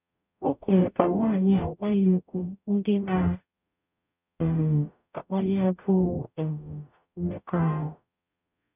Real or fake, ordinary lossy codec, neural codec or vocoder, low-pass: fake; none; codec, 44.1 kHz, 0.9 kbps, DAC; 3.6 kHz